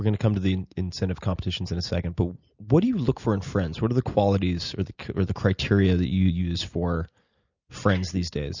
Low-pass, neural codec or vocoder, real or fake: 7.2 kHz; none; real